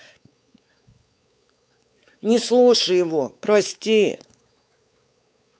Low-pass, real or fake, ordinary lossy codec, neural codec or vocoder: none; fake; none; codec, 16 kHz, 4 kbps, X-Codec, WavLM features, trained on Multilingual LibriSpeech